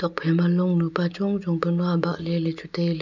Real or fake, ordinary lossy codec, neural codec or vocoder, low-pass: real; none; none; 7.2 kHz